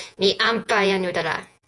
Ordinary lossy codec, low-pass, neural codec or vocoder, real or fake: MP3, 96 kbps; 10.8 kHz; vocoder, 48 kHz, 128 mel bands, Vocos; fake